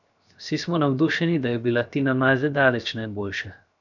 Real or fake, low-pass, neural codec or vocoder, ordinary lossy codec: fake; 7.2 kHz; codec, 16 kHz, 0.7 kbps, FocalCodec; none